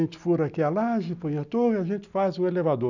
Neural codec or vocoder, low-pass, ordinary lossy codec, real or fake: none; 7.2 kHz; none; real